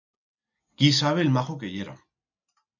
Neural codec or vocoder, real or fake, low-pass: none; real; 7.2 kHz